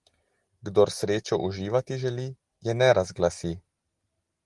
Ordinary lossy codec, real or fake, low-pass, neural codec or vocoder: Opus, 24 kbps; real; 10.8 kHz; none